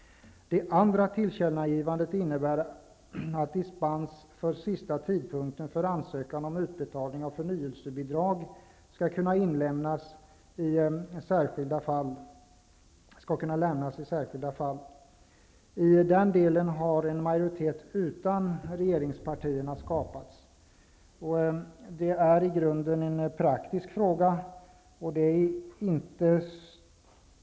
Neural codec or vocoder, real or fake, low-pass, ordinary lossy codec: none; real; none; none